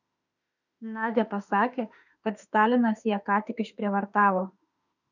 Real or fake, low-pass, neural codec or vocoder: fake; 7.2 kHz; autoencoder, 48 kHz, 32 numbers a frame, DAC-VAE, trained on Japanese speech